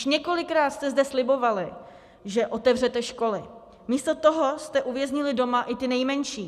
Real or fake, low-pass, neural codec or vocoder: real; 14.4 kHz; none